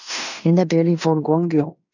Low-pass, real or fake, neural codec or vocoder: 7.2 kHz; fake; codec, 16 kHz in and 24 kHz out, 0.9 kbps, LongCat-Audio-Codec, fine tuned four codebook decoder